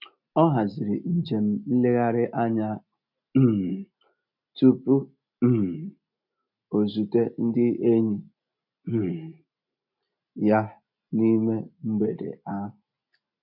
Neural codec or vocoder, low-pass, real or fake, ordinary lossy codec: none; 5.4 kHz; real; MP3, 48 kbps